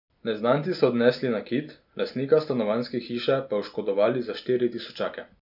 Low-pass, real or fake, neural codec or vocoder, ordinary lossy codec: 5.4 kHz; real; none; none